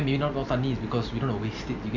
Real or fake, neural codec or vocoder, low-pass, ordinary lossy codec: real; none; 7.2 kHz; none